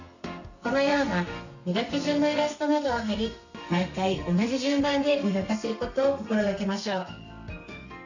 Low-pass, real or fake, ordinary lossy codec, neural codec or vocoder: 7.2 kHz; fake; none; codec, 32 kHz, 1.9 kbps, SNAC